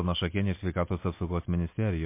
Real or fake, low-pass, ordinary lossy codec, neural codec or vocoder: real; 3.6 kHz; MP3, 24 kbps; none